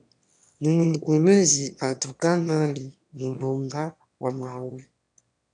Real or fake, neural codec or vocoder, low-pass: fake; autoencoder, 22.05 kHz, a latent of 192 numbers a frame, VITS, trained on one speaker; 9.9 kHz